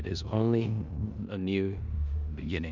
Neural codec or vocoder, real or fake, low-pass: codec, 16 kHz in and 24 kHz out, 0.9 kbps, LongCat-Audio-Codec, four codebook decoder; fake; 7.2 kHz